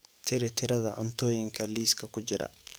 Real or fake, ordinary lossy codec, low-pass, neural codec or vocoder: fake; none; none; codec, 44.1 kHz, 7.8 kbps, DAC